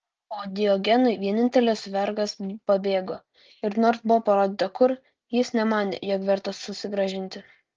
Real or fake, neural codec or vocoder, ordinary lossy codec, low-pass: real; none; Opus, 24 kbps; 7.2 kHz